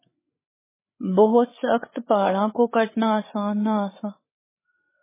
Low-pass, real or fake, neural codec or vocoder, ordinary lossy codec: 3.6 kHz; fake; codec, 16 kHz, 8 kbps, FreqCodec, larger model; MP3, 16 kbps